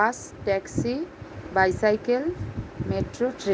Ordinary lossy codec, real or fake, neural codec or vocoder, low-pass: none; real; none; none